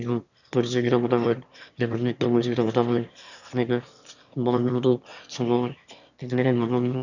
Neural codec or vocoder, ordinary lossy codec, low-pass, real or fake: autoencoder, 22.05 kHz, a latent of 192 numbers a frame, VITS, trained on one speaker; none; 7.2 kHz; fake